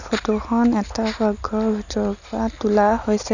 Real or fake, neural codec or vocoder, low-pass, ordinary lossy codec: real; none; 7.2 kHz; none